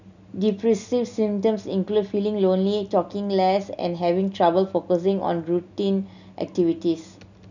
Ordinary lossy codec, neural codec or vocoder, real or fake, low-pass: none; none; real; 7.2 kHz